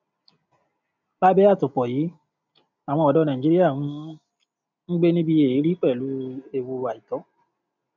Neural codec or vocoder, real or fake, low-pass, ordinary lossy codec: none; real; 7.2 kHz; none